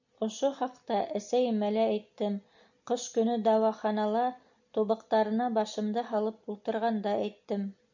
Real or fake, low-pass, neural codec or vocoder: real; 7.2 kHz; none